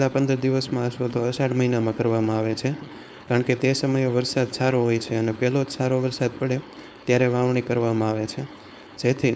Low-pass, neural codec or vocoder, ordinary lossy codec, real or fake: none; codec, 16 kHz, 4.8 kbps, FACodec; none; fake